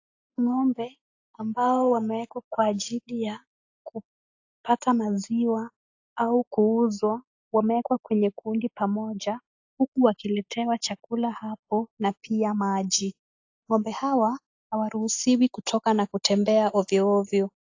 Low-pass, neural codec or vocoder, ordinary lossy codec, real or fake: 7.2 kHz; none; AAC, 48 kbps; real